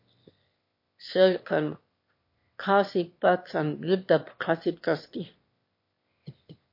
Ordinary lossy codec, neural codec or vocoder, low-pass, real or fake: MP3, 32 kbps; autoencoder, 22.05 kHz, a latent of 192 numbers a frame, VITS, trained on one speaker; 5.4 kHz; fake